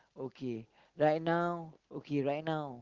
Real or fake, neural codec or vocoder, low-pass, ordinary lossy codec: real; none; 7.2 kHz; Opus, 16 kbps